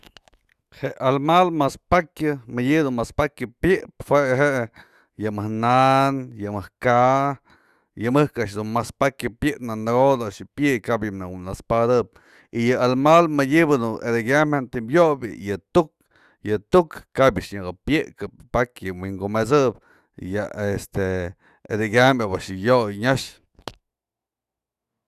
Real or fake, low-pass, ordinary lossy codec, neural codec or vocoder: fake; 14.4 kHz; Opus, 64 kbps; autoencoder, 48 kHz, 128 numbers a frame, DAC-VAE, trained on Japanese speech